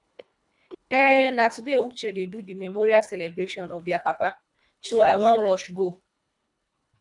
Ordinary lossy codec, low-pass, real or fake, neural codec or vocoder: none; 10.8 kHz; fake; codec, 24 kHz, 1.5 kbps, HILCodec